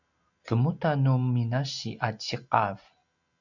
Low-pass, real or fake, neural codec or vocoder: 7.2 kHz; real; none